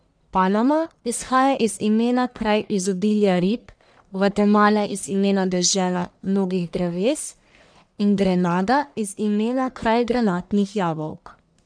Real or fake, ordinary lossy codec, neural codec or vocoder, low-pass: fake; none; codec, 44.1 kHz, 1.7 kbps, Pupu-Codec; 9.9 kHz